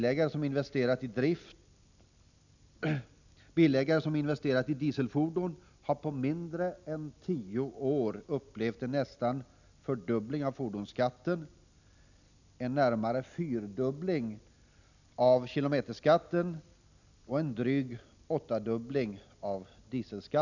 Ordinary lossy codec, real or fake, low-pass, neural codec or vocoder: none; real; 7.2 kHz; none